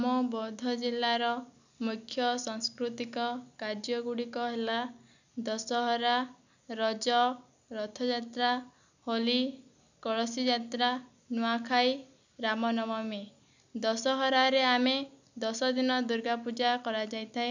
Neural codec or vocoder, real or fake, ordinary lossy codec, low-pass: none; real; none; 7.2 kHz